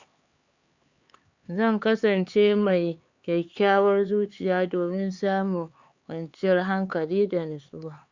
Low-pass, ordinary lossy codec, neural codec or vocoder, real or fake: 7.2 kHz; Opus, 64 kbps; codec, 16 kHz, 4 kbps, X-Codec, HuBERT features, trained on LibriSpeech; fake